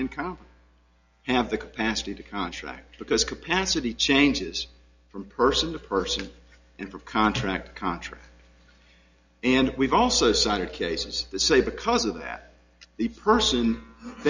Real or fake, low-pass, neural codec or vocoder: real; 7.2 kHz; none